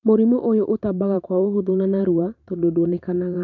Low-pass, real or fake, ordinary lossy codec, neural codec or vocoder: 7.2 kHz; fake; none; vocoder, 44.1 kHz, 128 mel bands every 512 samples, BigVGAN v2